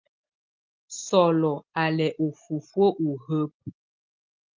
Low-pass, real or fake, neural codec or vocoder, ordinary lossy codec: 7.2 kHz; real; none; Opus, 24 kbps